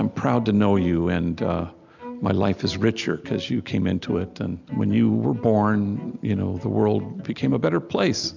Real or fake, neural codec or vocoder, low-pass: real; none; 7.2 kHz